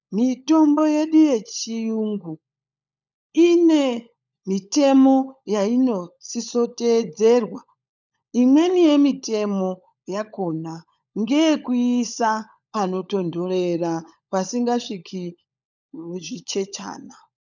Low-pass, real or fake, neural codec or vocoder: 7.2 kHz; fake; codec, 16 kHz, 16 kbps, FunCodec, trained on LibriTTS, 50 frames a second